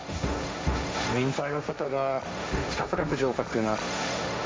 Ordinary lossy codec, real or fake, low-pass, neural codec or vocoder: none; fake; none; codec, 16 kHz, 1.1 kbps, Voila-Tokenizer